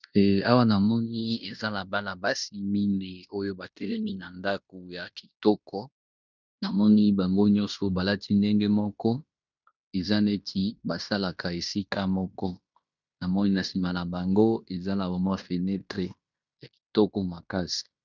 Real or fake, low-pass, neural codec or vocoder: fake; 7.2 kHz; codec, 16 kHz in and 24 kHz out, 0.9 kbps, LongCat-Audio-Codec, fine tuned four codebook decoder